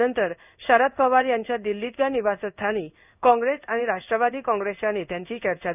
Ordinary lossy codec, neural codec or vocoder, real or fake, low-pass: none; codec, 16 kHz in and 24 kHz out, 1 kbps, XY-Tokenizer; fake; 3.6 kHz